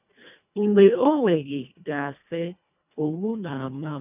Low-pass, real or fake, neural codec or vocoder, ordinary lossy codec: 3.6 kHz; fake; codec, 24 kHz, 1.5 kbps, HILCodec; none